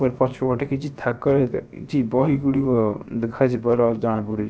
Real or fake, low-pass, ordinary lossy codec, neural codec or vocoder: fake; none; none; codec, 16 kHz, about 1 kbps, DyCAST, with the encoder's durations